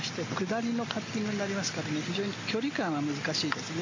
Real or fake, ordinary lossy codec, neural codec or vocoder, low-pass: real; MP3, 48 kbps; none; 7.2 kHz